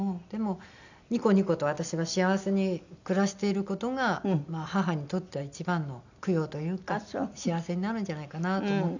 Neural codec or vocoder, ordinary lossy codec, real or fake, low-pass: none; none; real; 7.2 kHz